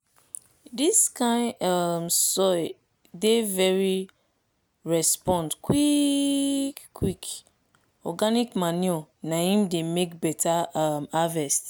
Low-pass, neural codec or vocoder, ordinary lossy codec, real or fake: none; none; none; real